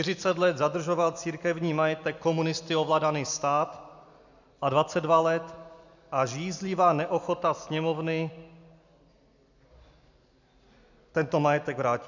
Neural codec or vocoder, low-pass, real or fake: none; 7.2 kHz; real